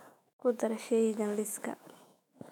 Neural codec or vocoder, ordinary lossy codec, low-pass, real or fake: autoencoder, 48 kHz, 128 numbers a frame, DAC-VAE, trained on Japanese speech; none; 19.8 kHz; fake